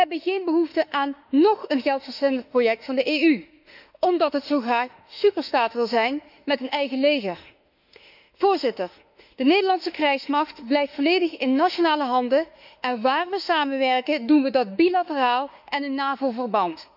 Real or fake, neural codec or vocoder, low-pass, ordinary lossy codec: fake; autoencoder, 48 kHz, 32 numbers a frame, DAC-VAE, trained on Japanese speech; 5.4 kHz; none